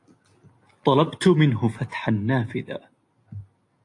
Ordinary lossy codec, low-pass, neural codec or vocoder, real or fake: AAC, 48 kbps; 10.8 kHz; none; real